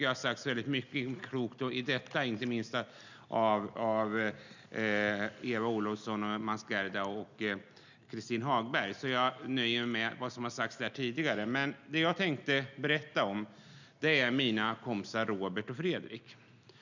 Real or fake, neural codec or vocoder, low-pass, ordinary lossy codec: real; none; 7.2 kHz; none